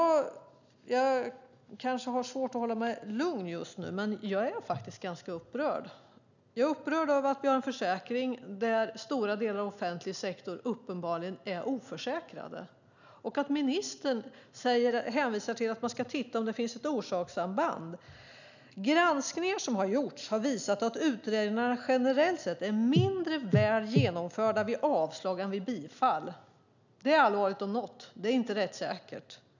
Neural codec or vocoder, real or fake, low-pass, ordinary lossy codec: none; real; 7.2 kHz; none